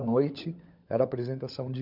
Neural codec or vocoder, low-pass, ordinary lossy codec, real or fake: codec, 16 kHz, 16 kbps, FunCodec, trained on LibriTTS, 50 frames a second; 5.4 kHz; none; fake